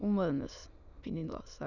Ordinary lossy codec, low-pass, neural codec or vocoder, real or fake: none; 7.2 kHz; autoencoder, 22.05 kHz, a latent of 192 numbers a frame, VITS, trained on many speakers; fake